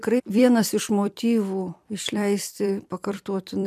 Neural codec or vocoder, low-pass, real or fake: vocoder, 44.1 kHz, 128 mel bands every 256 samples, BigVGAN v2; 14.4 kHz; fake